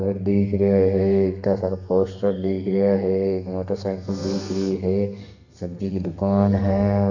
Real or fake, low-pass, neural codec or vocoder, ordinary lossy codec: fake; 7.2 kHz; codec, 32 kHz, 1.9 kbps, SNAC; none